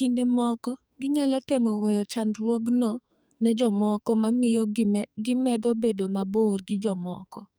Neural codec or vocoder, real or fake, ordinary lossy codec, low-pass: codec, 44.1 kHz, 2.6 kbps, SNAC; fake; none; none